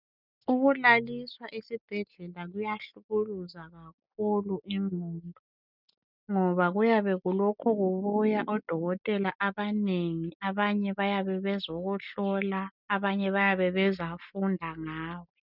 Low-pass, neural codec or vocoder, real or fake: 5.4 kHz; none; real